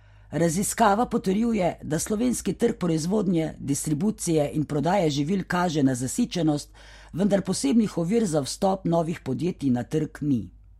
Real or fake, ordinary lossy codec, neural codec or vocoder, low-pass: real; MP3, 64 kbps; none; 19.8 kHz